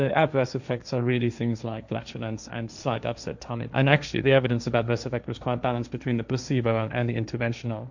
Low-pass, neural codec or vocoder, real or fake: 7.2 kHz; codec, 16 kHz, 1.1 kbps, Voila-Tokenizer; fake